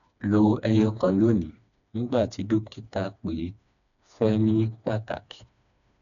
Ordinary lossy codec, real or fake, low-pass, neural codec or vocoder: none; fake; 7.2 kHz; codec, 16 kHz, 2 kbps, FreqCodec, smaller model